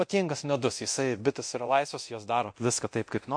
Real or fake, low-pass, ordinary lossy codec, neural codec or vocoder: fake; 9.9 kHz; MP3, 48 kbps; codec, 24 kHz, 0.9 kbps, DualCodec